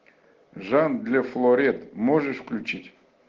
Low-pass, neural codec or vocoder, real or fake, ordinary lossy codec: 7.2 kHz; none; real; Opus, 16 kbps